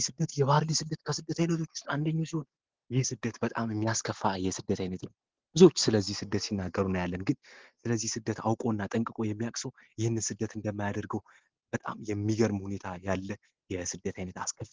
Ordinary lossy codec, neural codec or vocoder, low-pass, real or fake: Opus, 16 kbps; none; 7.2 kHz; real